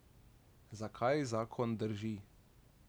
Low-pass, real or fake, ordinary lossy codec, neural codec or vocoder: none; real; none; none